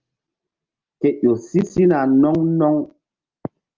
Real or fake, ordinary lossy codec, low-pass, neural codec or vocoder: real; Opus, 24 kbps; 7.2 kHz; none